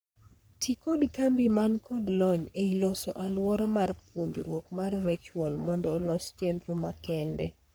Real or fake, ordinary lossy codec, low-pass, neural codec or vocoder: fake; none; none; codec, 44.1 kHz, 3.4 kbps, Pupu-Codec